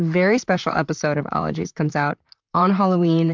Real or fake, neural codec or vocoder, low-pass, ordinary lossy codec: fake; vocoder, 44.1 kHz, 128 mel bands, Pupu-Vocoder; 7.2 kHz; MP3, 64 kbps